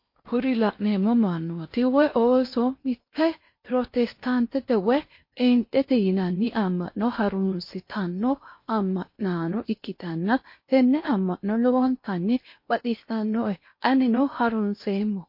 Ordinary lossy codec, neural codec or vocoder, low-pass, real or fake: MP3, 32 kbps; codec, 16 kHz in and 24 kHz out, 0.8 kbps, FocalCodec, streaming, 65536 codes; 5.4 kHz; fake